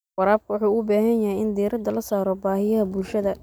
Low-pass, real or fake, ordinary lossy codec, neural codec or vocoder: none; real; none; none